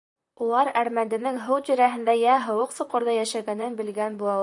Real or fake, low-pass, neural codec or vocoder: fake; 10.8 kHz; vocoder, 44.1 kHz, 128 mel bands, Pupu-Vocoder